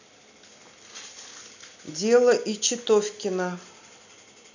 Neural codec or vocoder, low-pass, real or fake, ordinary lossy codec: none; 7.2 kHz; real; none